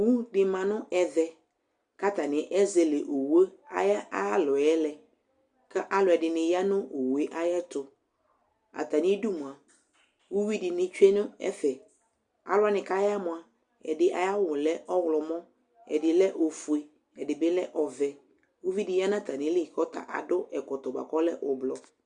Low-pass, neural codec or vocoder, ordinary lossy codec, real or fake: 10.8 kHz; none; Opus, 64 kbps; real